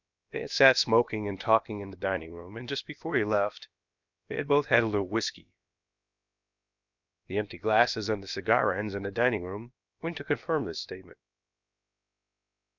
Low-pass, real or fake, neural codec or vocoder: 7.2 kHz; fake; codec, 16 kHz, about 1 kbps, DyCAST, with the encoder's durations